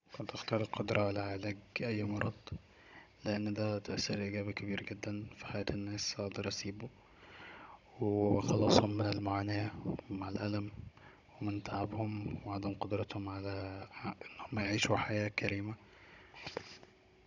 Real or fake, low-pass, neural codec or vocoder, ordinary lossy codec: fake; 7.2 kHz; codec, 16 kHz, 16 kbps, FunCodec, trained on Chinese and English, 50 frames a second; none